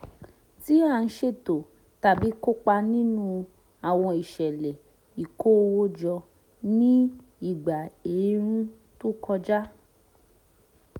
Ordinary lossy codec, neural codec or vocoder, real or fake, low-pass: none; none; real; 19.8 kHz